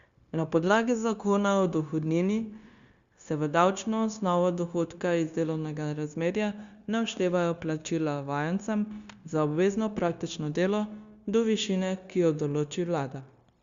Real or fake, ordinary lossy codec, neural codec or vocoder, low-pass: fake; Opus, 64 kbps; codec, 16 kHz, 0.9 kbps, LongCat-Audio-Codec; 7.2 kHz